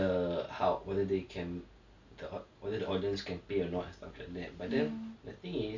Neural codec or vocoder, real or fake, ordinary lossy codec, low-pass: none; real; none; 7.2 kHz